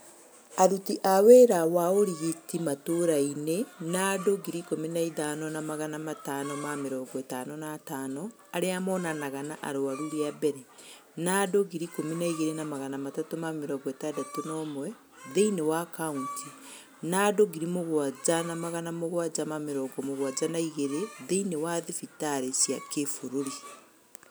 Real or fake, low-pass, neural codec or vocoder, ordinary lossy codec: real; none; none; none